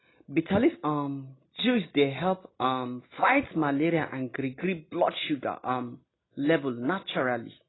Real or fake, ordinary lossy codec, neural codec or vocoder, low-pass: real; AAC, 16 kbps; none; 7.2 kHz